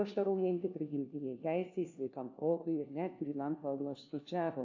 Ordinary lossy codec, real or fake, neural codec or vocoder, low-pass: AAC, 48 kbps; fake; codec, 16 kHz, 1 kbps, FunCodec, trained on LibriTTS, 50 frames a second; 7.2 kHz